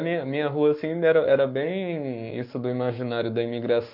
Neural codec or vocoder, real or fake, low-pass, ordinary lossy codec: codec, 44.1 kHz, 7.8 kbps, Pupu-Codec; fake; 5.4 kHz; MP3, 48 kbps